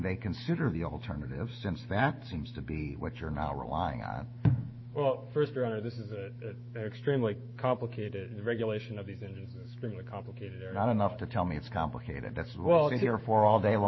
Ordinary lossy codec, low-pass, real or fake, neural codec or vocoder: MP3, 24 kbps; 7.2 kHz; fake; autoencoder, 48 kHz, 128 numbers a frame, DAC-VAE, trained on Japanese speech